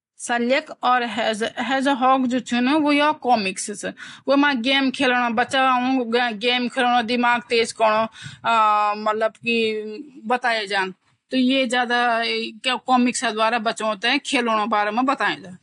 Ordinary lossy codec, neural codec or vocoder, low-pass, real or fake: AAC, 48 kbps; none; 10.8 kHz; real